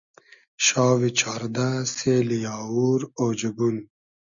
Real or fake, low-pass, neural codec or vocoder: real; 7.2 kHz; none